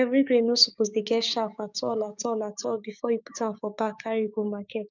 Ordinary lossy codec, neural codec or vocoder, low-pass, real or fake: none; codec, 16 kHz, 6 kbps, DAC; 7.2 kHz; fake